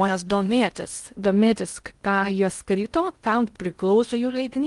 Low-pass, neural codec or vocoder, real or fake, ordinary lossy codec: 10.8 kHz; codec, 16 kHz in and 24 kHz out, 0.6 kbps, FocalCodec, streaming, 2048 codes; fake; Opus, 32 kbps